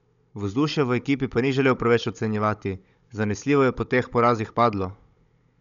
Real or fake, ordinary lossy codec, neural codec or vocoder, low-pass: fake; none; codec, 16 kHz, 16 kbps, FunCodec, trained on Chinese and English, 50 frames a second; 7.2 kHz